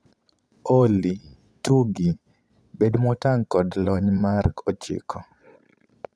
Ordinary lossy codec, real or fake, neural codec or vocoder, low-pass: none; fake; vocoder, 22.05 kHz, 80 mel bands, Vocos; none